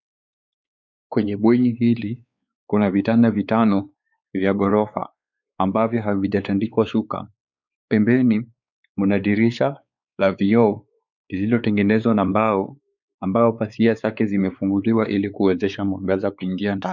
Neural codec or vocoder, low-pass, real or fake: codec, 16 kHz, 4 kbps, X-Codec, WavLM features, trained on Multilingual LibriSpeech; 7.2 kHz; fake